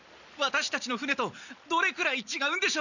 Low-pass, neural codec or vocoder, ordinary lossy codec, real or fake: 7.2 kHz; none; none; real